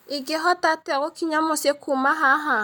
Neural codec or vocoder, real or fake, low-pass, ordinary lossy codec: none; real; none; none